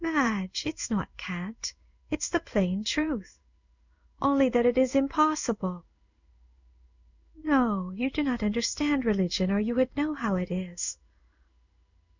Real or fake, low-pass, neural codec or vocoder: fake; 7.2 kHz; codec, 16 kHz in and 24 kHz out, 1 kbps, XY-Tokenizer